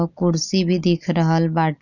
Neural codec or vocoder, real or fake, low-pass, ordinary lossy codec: none; real; 7.2 kHz; none